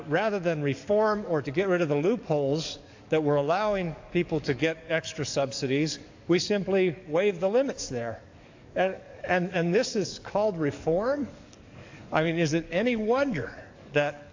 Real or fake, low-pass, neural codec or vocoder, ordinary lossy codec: fake; 7.2 kHz; codec, 44.1 kHz, 7.8 kbps, DAC; AAC, 48 kbps